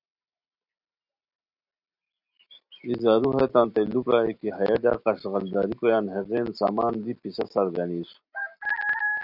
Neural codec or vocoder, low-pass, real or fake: none; 5.4 kHz; real